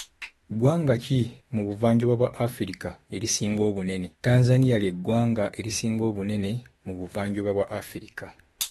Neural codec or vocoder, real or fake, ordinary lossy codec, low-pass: autoencoder, 48 kHz, 32 numbers a frame, DAC-VAE, trained on Japanese speech; fake; AAC, 32 kbps; 19.8 kHz